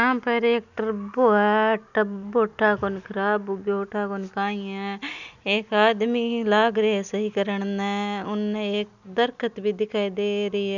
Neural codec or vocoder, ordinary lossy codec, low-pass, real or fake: none; none; 7.2 kHz; real